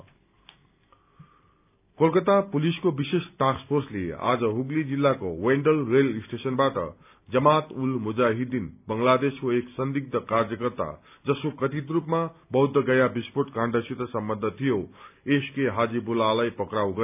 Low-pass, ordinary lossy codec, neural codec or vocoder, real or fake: 3.6 kHz; none; none; real